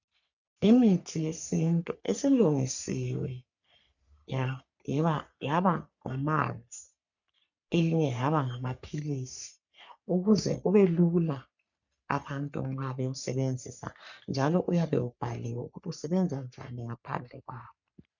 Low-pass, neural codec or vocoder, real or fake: 7.2 kHz; codec, 44.1 kHz, 3.4 kbps, Pupu-Codec; fake